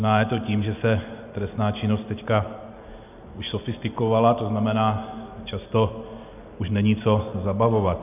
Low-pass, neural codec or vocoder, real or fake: 3.6 kHz; none; real